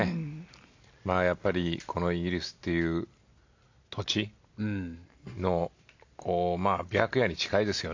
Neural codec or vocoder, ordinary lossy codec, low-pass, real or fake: none; AAC, 48 kbps; 7.2 kHz; real